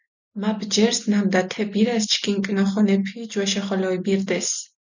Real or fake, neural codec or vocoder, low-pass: real; none; 7.2 kHz